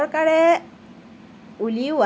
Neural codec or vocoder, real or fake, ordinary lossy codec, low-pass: none; real; none; none